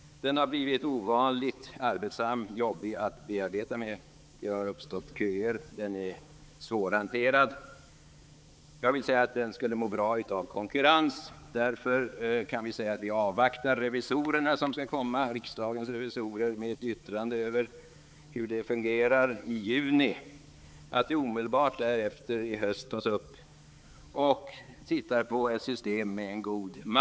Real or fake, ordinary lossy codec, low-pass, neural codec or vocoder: fake; none; none; codec, 16 kHz, 4 kbps, X-Codec, HuBERT features, trained on balanced general audio